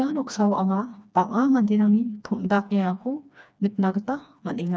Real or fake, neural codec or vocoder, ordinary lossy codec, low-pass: fake; codec, 16 kHz, 2 kbps, FreqCodec, smaller model; none; none